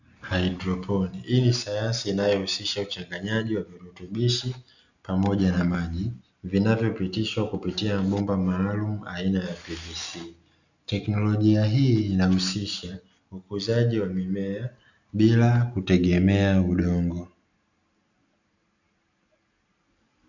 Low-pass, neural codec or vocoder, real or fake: 7.2 kHz; none; real